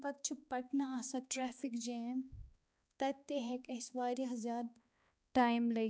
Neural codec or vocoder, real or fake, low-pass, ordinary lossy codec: codec, 16 kHz, 2 kbps, X-Codec, HuBERT features, trained on balanced general audio; fake; none; none